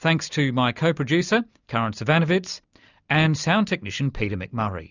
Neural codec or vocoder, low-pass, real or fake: none; 7.2 kHz; real